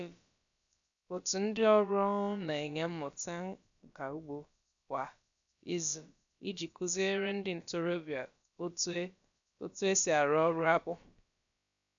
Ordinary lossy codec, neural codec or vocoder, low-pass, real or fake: none; codec, 16 kHz, about 1 kbps, DyCAST, with the encoder's durations; 7.2 kHz; fake